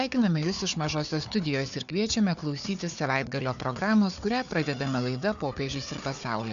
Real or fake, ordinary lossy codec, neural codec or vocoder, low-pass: fake; MP3, 96 kbps; codec, 16 kHz, 4 kbps, FunCodec, trained on Chinese and English, 50 frames a second; 7.2 kHz